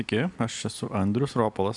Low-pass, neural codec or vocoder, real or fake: 10.8 kHz; none; real